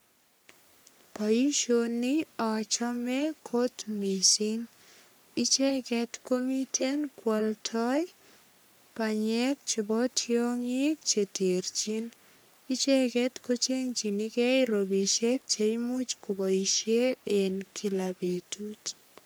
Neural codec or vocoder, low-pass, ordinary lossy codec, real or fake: codec, 44.1 kHz, 3.4 kbps, Pupu-Codec; none; none; fake